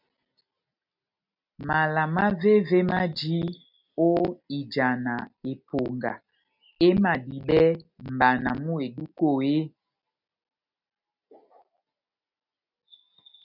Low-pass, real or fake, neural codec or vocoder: 5.4 kHz; real; none